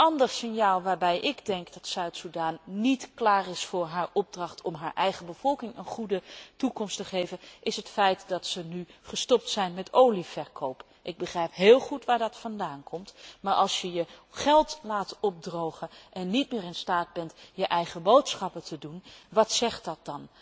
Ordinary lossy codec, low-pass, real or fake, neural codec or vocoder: none; none; real; none